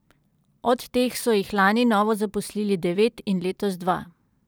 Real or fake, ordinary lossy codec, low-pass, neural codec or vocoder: fake; none; none; vocoder, 44.1 kHz, 128 mel bands every 512 samples, BigVGAN v2